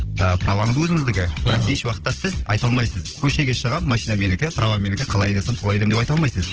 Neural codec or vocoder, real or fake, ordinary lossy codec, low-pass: codec, 16 kHz, 16 kbps, FunCodec, trained on Chinese and English, 50 frames a second; fake; Opus, 16 kbps; 7.2 kHz